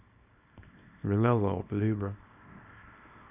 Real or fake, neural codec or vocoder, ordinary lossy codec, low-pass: fake; codec, 24 kHz, 0.9 kbps, WavTokenizer, small release; none; 3.6 kHz